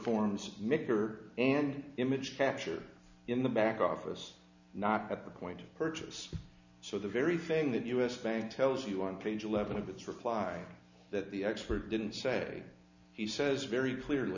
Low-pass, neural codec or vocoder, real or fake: 7.2 kHz; none; real